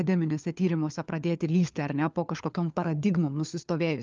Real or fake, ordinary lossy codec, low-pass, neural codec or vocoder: fake; Opus, 24 kbps; 7.2 kHz; codec, 16 kHz, 4 kbps, FunCodec, trained on LibriTTS, 50 frames a second